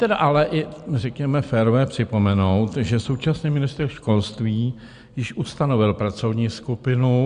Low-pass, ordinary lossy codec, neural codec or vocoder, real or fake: 9.9 kHz; Opus, 64 kbps; none; real